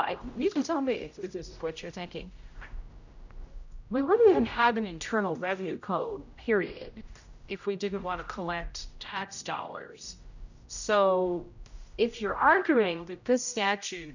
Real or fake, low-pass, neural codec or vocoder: fake; 7.2 kHz; codec, 16 kHz, 0.5 kbps, X-Codec, HuBERT features, trained on general audio